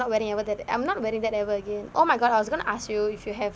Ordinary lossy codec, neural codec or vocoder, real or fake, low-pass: none; none; real; none